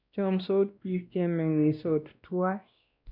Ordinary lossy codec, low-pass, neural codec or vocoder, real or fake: none; 5.4 kHz; codec, 16 kHz, 1 kbps, X-Codec, WavLM features, trained on Multilingual LibriSpeech; fake